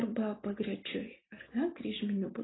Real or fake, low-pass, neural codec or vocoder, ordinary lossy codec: real; 7.2 kHz; none; AAC, 16 kbps